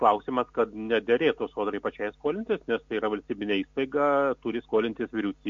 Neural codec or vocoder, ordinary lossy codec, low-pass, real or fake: none; MP3, 48 kbps; 7.2 kHz; real